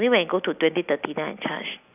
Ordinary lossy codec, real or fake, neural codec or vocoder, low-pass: none; real; none; 3.6 kHz